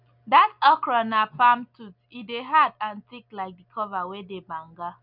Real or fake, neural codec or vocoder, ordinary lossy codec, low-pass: real; none; none; 5.4 kHz